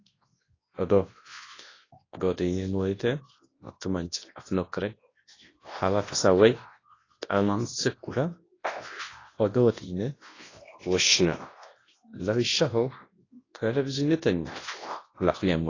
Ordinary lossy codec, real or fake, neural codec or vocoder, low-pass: AAC, 32 kbps; fake; codec, 24 kHz, 0.9 kbps, WavTokenizer, large speech release; 7.2 kHz